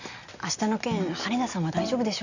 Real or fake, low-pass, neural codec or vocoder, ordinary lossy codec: real; 7.2 kHz; none; none